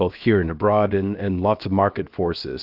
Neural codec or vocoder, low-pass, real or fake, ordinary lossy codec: codec, 16 kHz, 0.7 kbps, FocalCodec; 5.4 kHz; fake; Opus, 32 kbps